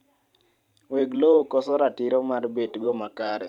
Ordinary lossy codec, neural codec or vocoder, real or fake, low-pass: none; vocoder, 44.1 kHz, 128 mel bands every 512 samples, BigVGAN v2; fake; 19.8 kHz